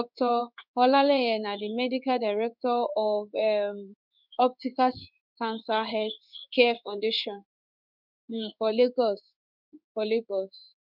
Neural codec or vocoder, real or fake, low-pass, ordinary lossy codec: codec, 16 kHz in and 24 kHz out, 1 kbps, XY-Tokenizer; fake; 5.4 kHz; none